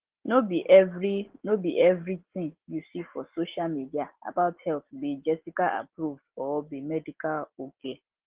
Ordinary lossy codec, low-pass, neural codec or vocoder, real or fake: Opus, 16 kbps; 3.6 kHz; none; real